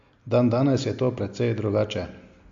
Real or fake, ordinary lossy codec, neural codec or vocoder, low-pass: real; MP3, 48 kbps; none; 7.2 kHz